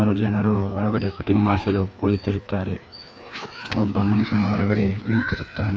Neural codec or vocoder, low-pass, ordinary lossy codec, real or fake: codec, 16 kHz, 2 kbps, FreqCodec, larger model; none; none; fake